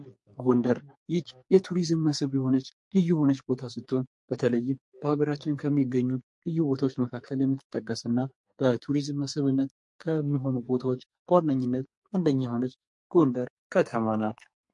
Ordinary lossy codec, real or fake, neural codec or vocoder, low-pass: MP3, 48 kbps; fake; codec, 44.1 kHz, 2.6 kbps, SNAC; 10.8 kHz